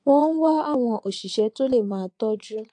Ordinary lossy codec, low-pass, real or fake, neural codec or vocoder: AAC, 64 kbps; 9.9 kHz; fake; vocoder, 22.05 kHz, 80 mel bands, WaveNeXt